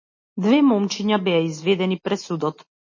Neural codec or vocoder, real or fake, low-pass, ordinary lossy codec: none; real; 7.2 kHz; MP3, 32 kbps